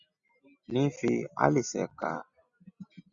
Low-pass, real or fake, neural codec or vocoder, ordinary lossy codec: 7.2 kHz; real; none; Opus, 64 kbps